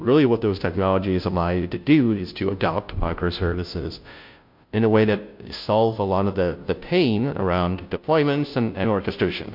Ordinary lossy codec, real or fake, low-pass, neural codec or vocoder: MP3, 48 kbps; fake; 5.4 kHz; codec, 16 kHz, 0.5 kbps, FunCodec, trained on Chinese and English, 25 frames a second